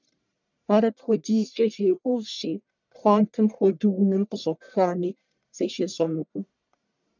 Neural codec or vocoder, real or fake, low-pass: codec, 44.1 kHz, 1.7 kbps, Pupu-Codec; fake; 7.2 kHz